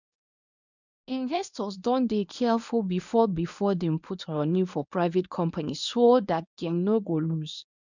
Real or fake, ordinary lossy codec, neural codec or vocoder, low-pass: fake; none; codec, 24 kHz, 0.9 kbps, WavTokenizer, medium speech release version 1; 7.2 kHz